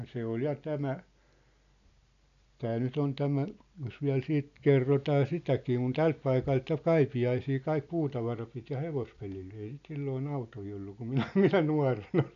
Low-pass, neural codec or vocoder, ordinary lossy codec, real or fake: 7.2 kHz; none; none; real